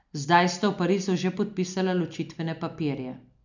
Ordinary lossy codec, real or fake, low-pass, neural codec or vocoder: none; real; 7.2 kHz; none